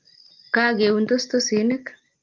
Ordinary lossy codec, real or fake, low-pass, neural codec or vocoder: Opus, 32 kbps; real; 7.2 kHz; none